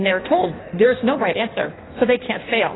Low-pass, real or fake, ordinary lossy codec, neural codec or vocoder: 7.2 kHz; fake; AAC, 16 kbps; codec, 16 kHz in and 24 kHz out, 1.1 kbps, FireRedTTS-2 codec